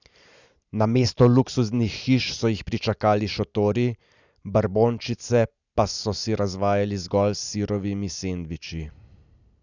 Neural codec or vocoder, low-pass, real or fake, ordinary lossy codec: none; 7.2 kHz; real; none